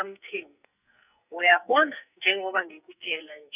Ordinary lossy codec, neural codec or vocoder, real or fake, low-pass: none; codec, 44.1 kHz, 2.6 kbps, SNAC; fake; 3.6 kHz